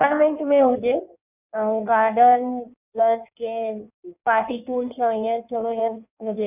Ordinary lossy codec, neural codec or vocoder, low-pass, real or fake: none; codec, 16 kHz in and 24 kHz out, 1.1 kbps, FireRedTTS-2 codec; 3.6 kHz; fake